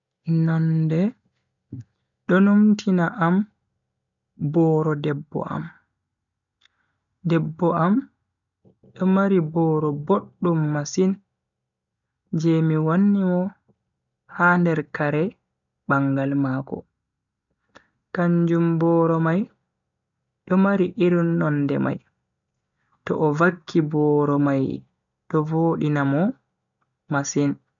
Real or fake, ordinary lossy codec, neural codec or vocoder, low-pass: real; none; none; 7.2 kHz